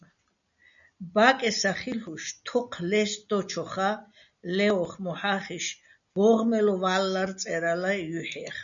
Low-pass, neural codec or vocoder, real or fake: 7.2 kHz; none; real